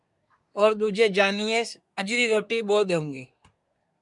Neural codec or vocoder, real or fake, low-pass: codec, 24 kHz, 1 kbps, SNAC; fake; 10.8 kHz